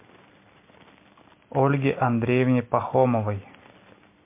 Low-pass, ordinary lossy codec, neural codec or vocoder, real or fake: 3.6 kHz; MP3, 24 kbps; none; real